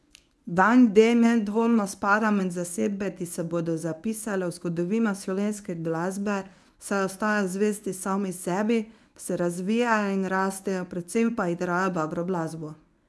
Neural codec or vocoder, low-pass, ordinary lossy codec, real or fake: codec, 24 kHz, 0.9 kbps, WavTokenizer, medium speech release version 1; none; none; fake